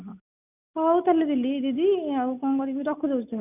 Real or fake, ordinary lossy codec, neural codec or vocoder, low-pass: real; none; none; 3.6 kHz